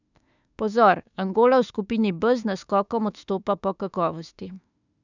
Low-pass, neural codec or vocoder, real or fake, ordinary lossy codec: 7.2 kHz; autoencoder, 48 kHz, 32 numbers a frame, DAC-VAE, trained on Japanese speech; fake; none